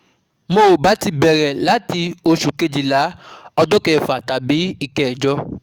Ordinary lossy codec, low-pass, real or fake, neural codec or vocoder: Opus, 64 kbps; 19.8 kHz; fake; codec, 44.1 kHz, 7.8 kbps, DAC